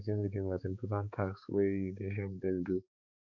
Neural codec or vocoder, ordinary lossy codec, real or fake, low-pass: codec, 16 kHz, 4 kbps, X-Codec, HuBERT features, trained on balanced general audio; none; fake; 7.2 kHz